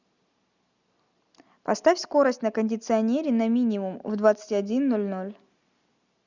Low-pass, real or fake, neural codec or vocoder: 7.2 kHz; real; none